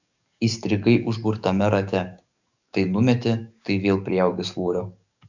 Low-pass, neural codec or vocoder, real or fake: 7.2 kHz; codec, 44.1 kHz, 7.8 kbps, DAC; fake